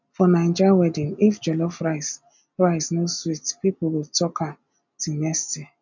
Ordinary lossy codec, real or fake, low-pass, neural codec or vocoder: none; real; 7.2 kHz; none